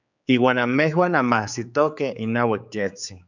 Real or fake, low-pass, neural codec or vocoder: fake; 7.2 kHz; codec, 16 kHz, 4 kbps, X-Codec, HuBERT features, trained on general audio